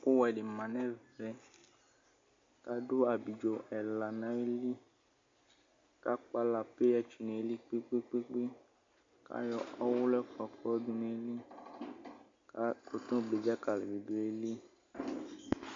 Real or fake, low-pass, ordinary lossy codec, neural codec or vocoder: real; 7.2 kHz; MP3, 48 kbps; none